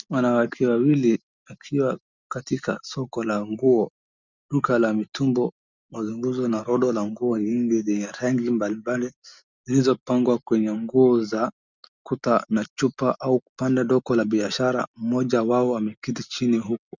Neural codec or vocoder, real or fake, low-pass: none; real; 7.2 kHz